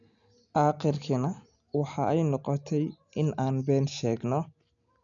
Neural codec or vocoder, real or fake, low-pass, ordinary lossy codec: none; real; 7.2 kHz; none